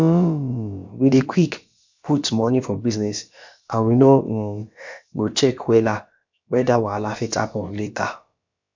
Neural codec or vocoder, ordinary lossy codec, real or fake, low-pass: codec, 16 kHz, about 1 kbps, DyCAST, with the encoder's durations; MP3, 64 kbps; fake; 7.2 kHz